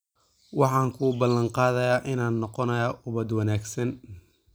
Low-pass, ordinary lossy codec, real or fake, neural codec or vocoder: none; none; real; none